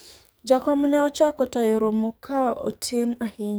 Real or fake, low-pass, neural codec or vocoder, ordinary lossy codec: fake; none; codec, 44.1 kHz, 2.6 kbps, SNAC; none